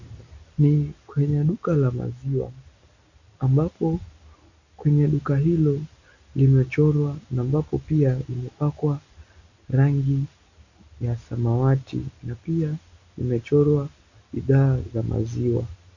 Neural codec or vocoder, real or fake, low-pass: none; real; 7.2 kHz